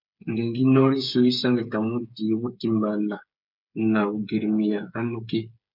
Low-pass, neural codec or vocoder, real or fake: 5.4 kHz; codec, 16 kHz, 8 kbps, FreqCodec, smaller model; fake